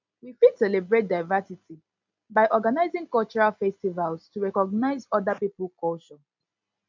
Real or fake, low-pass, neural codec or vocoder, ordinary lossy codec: real; 7.2 kHz; none; MP3, 64 kbps